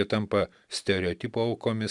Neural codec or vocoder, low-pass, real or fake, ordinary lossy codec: none; 10.8 kHz; real; MP3, 96 kbps